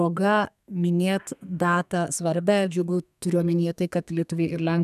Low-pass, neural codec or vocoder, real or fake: 14.4 kHz; codec, 32 kHz, 1.9 kbps, SNAC; fake